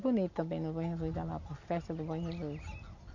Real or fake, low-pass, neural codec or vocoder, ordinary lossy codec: real; 7.2 kHz; none; none